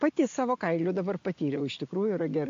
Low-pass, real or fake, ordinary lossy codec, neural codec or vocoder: 7.2 kHz; real; AAC, 48 kbps; none